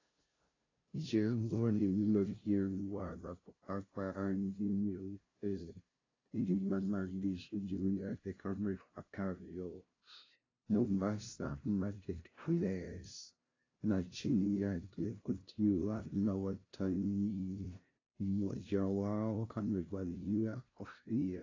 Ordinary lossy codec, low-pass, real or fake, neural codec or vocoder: AAC, 32 kbps; 7.2 kHz; fake; codec, 16 kHz, 0.5 kbps, FunCodec, trained on LibriTTS, 25 frames a second